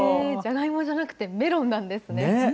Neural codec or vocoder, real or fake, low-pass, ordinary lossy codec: none; real; none; none